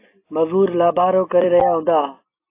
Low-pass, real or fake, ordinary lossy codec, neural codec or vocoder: 3.6 kHz; real; AAC, 24 kbps; none